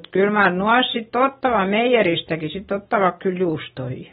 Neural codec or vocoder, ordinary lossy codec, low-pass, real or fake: none; AAC, 16 kbps; 7.2 kHz; real